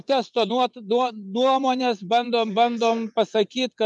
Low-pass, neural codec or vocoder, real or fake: 10.8 kHz; vocoder, 24 kHz, 100 mel bands, Vocos; fake